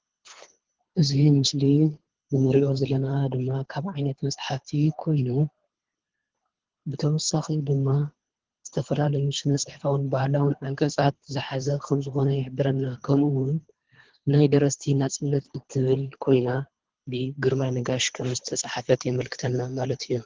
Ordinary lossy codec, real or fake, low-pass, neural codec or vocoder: Opus, 16 kbps; fake; 7.2 kHz; codec, 24 kHz, 3 kbps, HILCodec